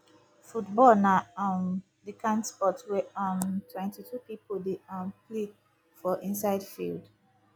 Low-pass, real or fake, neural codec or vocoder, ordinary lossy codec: none; real; none; none